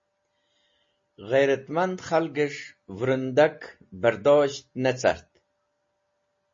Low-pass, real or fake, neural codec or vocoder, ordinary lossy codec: 7.2 kHz; real; none; MP3, 32 kbps